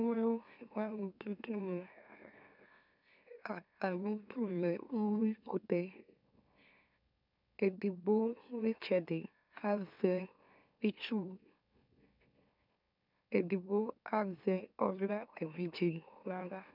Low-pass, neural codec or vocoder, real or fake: 5.4 kHz; autoencoder, 44.1 kHz, a latent of 192 numbers a frame, MeloTTS; fake